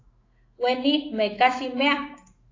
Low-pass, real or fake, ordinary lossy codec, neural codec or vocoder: 7.2 kHz; fake; AAC, 32 kbps; autoencoder, 48 kHz, 128 numbers a frame, DAC-VAE, trained on Japanese speech